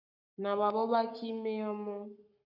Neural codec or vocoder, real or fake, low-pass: codec, 44.1 kHz, 7.8 kbps, Pupu-Codec; fake; 5.4 kHz